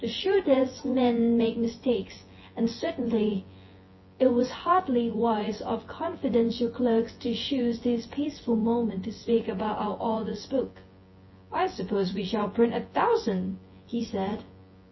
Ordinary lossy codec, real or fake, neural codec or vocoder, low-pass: MP3, 24 kbps; fake; vocoder, 24 kHz, 100 mel bands, Vocos; 7.2 kHz